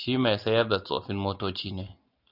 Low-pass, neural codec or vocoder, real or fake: 5.4 kHz; none; real